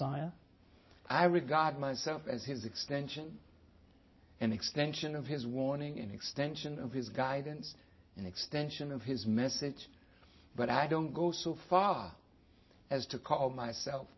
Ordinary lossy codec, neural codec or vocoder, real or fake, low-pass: MP3, 24 kbps; none; real; 7.2 kHz